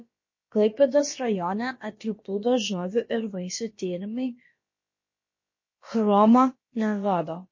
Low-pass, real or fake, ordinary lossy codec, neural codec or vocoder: 7.2 kHz; fake; MP3, 32 kbps; codec, 16 kHz, about 1 kbps, DyCAST, with the encoder's durations